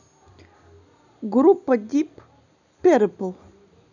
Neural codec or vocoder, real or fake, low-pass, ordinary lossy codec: none; real; 7.2 kHz; none